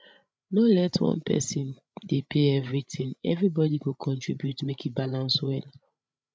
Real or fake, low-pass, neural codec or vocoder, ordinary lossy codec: fake; none; codec, 16 kHz, 16 kbps, FreqCodec, larger model; none